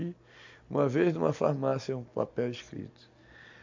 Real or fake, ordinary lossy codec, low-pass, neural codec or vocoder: real; MP3, 48 kbps; 7.2 kHz; none